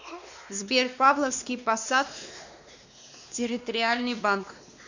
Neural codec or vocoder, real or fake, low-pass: codec, 16 kHz, 2 kbps, X-Codec, WavLM features, trained on Multilingual LibriSpeech; fake; 7.2 kHz